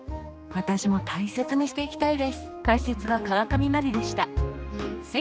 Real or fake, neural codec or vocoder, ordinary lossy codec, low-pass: fake; codec, 16 kHz, 2 kbps, X-Codec, HuBERT features, trained on general audio; none; none